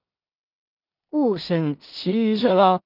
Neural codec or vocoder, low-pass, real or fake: codec, 16 kHz in and 24 kHz out, 0.4 kbps, LongCat-Audio-Codec, two codebook decoder; 5.4 kHz; fake